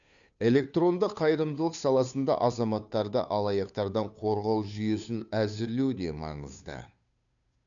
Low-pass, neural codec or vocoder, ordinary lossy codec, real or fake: 7.2 kHz; codec, 16 kHz, 2 kbps, FunCodec, trained on Chinese and English, 25 frames a second; none; fake